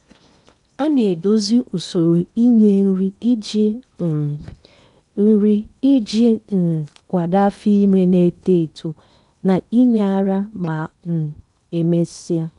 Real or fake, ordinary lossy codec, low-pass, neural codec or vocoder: fake; none; 10.8 kHz; codec, 16 kHz in and 24 kHz out, 0.8 kbps, FocalCodec, streaming, 65536 codes